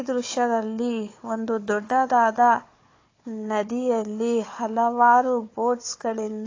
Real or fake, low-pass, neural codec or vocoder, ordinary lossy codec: fake; 7.2 kHz; codec, 16 kHz, 4 kbps, FunCodec, trained on Chinese and English, 50 frames a second; AAC, 32 kbps